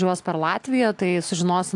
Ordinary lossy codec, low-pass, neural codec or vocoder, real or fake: AAC, 64 kbps; 10.8 kHz; none; real